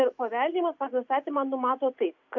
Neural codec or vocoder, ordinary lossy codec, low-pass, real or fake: none; AAC, 48 kbps; 7.2 kHz; real